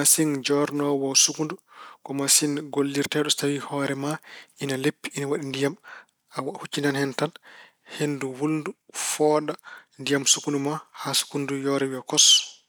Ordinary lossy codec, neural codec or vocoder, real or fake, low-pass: none; none; real; none